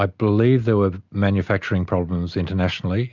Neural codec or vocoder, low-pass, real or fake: none; 7.2 kHz; real